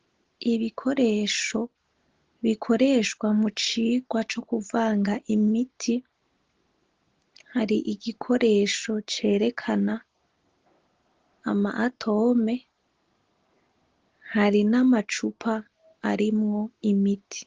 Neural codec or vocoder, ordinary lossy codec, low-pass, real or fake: none; Opus, 16 kbps; 7.2 kHz; real